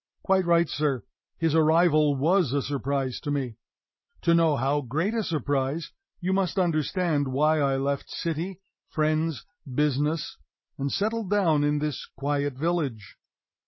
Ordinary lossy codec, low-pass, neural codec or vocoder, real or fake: MP3, 24 kbps; 7.2 kHz; none; real